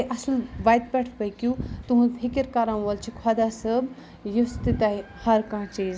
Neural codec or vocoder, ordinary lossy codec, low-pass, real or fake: none; none; none; real